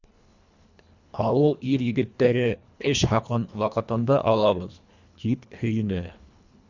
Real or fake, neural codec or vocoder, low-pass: fake; codec, 24 kHz, 1.5 kbps, HILCodec; 7.2 kHz